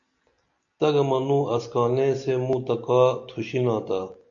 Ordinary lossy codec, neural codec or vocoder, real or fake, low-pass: AAC, 64 kbps; none; real; 7.2 kHz